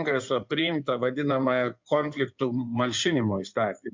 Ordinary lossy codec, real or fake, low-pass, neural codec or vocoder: MP3, 64 kbps; fake; 7.2 kHz; codec, 16 kHz in and 24 kHz out, 2.2 kbps, FireRedTTS-2 codec